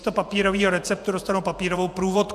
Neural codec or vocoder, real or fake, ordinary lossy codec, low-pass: vocoder, 48 kHz, 128 mel bands, Vocos; fake; AAC, 96 kbps; 14.4 kHz